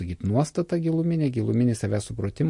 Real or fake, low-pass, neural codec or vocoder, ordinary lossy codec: real; 10.8 kHz; none; MP3, 48 kbps